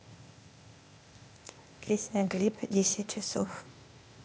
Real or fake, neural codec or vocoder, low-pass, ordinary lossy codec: fake; codec, 16 kHz, 0.8 kbps, ZipCodec; none; none